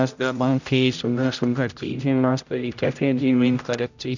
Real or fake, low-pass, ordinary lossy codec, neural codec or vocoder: fake; 7.2 kHz; none; codec, 16 kHz, 0.5 kbps, X-Codec, HuBERT features, trained on general audio